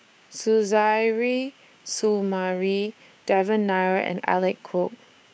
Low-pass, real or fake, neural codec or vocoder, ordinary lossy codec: none; fake; codec, 16 kHz, 6 kbps, DAC; none